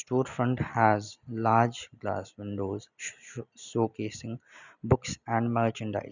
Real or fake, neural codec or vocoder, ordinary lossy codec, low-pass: real; none; none; 7.2 kHz